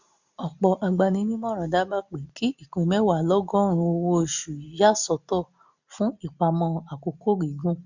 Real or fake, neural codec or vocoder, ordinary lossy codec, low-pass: real; none; none; 7.2 kHz